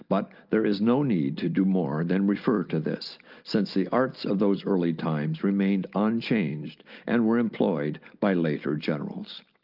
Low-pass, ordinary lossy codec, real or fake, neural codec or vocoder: 5.4 kHz; Opus, 24 kbps; real; none